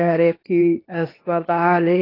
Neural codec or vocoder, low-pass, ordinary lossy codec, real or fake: codec, 16 kHz, 0.8 kbps, ZipCodec; 5.4 kHz; AAC, 24 kbps; fake